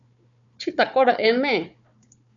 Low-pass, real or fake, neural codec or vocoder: 7.2 kHz; fake; codec, 16 kHz, 4 kbps, FunCodec, trained on Chinese and English, 50 frames a second